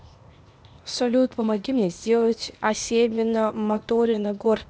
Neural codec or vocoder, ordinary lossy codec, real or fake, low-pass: codec, 16 kHz, 0.8 kbps, ZipCodec; none; fake; none